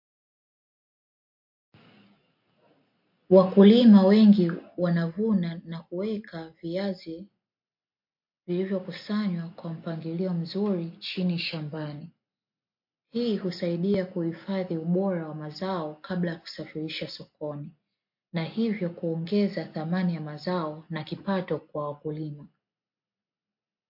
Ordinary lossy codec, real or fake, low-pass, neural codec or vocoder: MP3, 32 kbps; real; 5.4 kHz; none